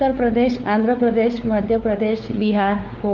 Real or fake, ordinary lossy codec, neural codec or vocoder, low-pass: fake; Opus, 24 kbps; codec, 16 kHz, 4 kbps, X-Codec, WavLM features, trained on Multilingual LibriSpeech; 7.2 kHz